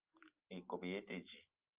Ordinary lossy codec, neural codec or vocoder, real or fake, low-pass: Opus, 24 kbps; none; real; 3.6 kHz